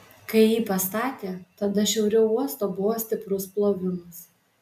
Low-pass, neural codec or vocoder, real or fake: 14.4 kHz; none; real